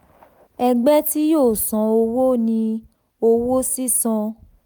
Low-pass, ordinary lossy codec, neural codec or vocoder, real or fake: none; none; none; real